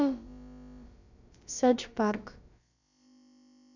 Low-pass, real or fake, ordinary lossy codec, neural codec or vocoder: 7.2 kHz; fake; none; codec, 16 kHz, about 1 kbps, DyCAST, with the encoder's durations